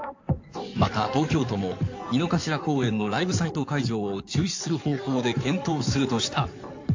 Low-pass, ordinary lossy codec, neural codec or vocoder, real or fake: 7.2 kHz; AAC, 48 kbps; codec, 16 kHz in and 24 kHz out, 2.2 kbps, FireRedTTS-2 codec; fake